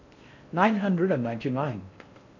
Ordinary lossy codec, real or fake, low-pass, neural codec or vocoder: none; fake; 7.2 kHz; codec, 16 kHz in and 24 kHz out, 0.6 kbps, FocalCodec, streaming, 4096 codes